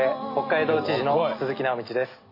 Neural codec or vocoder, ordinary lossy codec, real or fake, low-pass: none; MP3, 24 kbps; real; 5.4 kHz